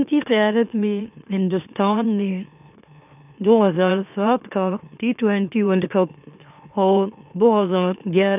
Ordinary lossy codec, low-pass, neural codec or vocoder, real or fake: none; 3.6 kHz; autoencoder, 44.1 kHz, a latent of 192 numbers a frame, MeloTTS; fake